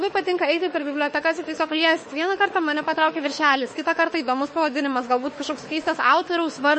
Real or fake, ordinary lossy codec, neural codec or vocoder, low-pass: fake; MP3, 32 kbps; autoencoder, 48 kHz, 32 numbers a frame, DAC-VAE, trained on Japanese speech; 10.8 kHz